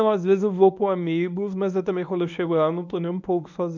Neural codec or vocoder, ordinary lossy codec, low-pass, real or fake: codec, 24 kHz, 0.9 kbps, WavTokenizer, medium speech release version 1; none; 7.2 kHz; fake